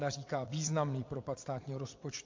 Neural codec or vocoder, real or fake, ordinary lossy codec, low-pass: vocoder, 22.05 kHz, 80 mel bands, WaveNeXt; fake; MP3, 48 kbps; 7.2 kHz